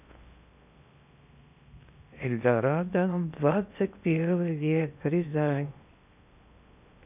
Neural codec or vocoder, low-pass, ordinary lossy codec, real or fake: codec, 16 kHz in and 24 kHz out, 0.6 kbps, FocalCodec, streaming, 4096 codes; 3.6 kHz; none; fake